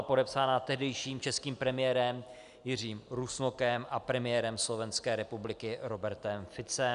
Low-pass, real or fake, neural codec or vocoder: 10.8 kHz; real; none